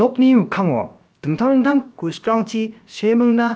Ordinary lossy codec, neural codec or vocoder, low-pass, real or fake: none; codec, 16 kHz, about 1 kbps, DyCAST, with the encoder's durations; none; fake